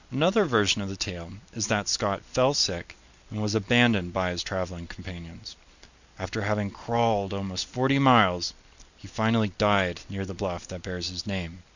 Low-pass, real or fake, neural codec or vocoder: 7.2 kHz; real; none